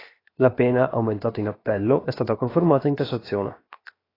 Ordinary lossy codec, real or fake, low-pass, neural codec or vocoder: AAC, 24 kbps; fake; 5.4 kHz; codec, 16 kHz, 0.7 kbps, FocalCodec